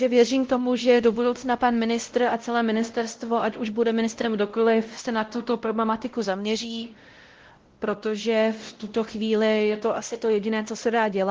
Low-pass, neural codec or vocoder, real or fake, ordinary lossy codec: 7.2 kHz; codec, 16 kHz, 0.5 kbps, X-Codec, WavLM features, trained on Multilingual LibriSpeech; fake; Opus, 16 kbps